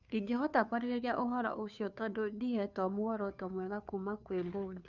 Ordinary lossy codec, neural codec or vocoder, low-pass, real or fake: none; codec, 16 kHz, 2 kbps, FunCodec, trained on Chinese and English, 25 frames a second; 7.2 kHz; fake